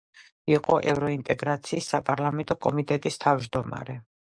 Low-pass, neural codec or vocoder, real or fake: 9.9 kHz; codec, 44.1 kHz, 7.8 kbps, DAC; fake